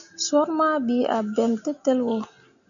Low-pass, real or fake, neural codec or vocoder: 7.2 kHz; real; none